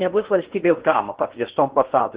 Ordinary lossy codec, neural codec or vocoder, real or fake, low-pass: Opus, 16 kbps; codec, 16 kHz in and 24 kHz out, 0.8 kbps, FocalCodec, streaming, 65536 codes; fake; 3.6 kHz